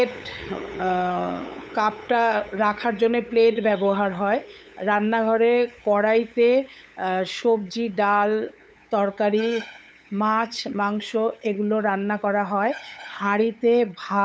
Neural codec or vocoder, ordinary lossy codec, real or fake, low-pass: codec, 16 kHz, 8 kbps, FunCodec, trained on LibriTTS, 25 frames a second; none; fake; none